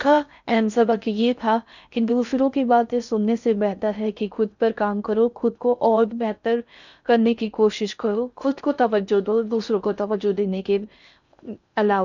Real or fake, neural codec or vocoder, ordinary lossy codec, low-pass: fake; codec, 16 kHz in and 24 kHz out, 0.6 kbps, FocalCodec, streaming, 4096 codes; none; 7.2 kHz